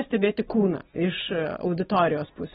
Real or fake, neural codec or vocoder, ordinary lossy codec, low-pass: real; none; AAC, 16 kbps; 10.8 kHz